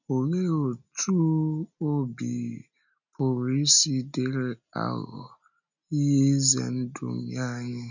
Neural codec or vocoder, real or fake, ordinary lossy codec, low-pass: none; real; none; 7.2 kHz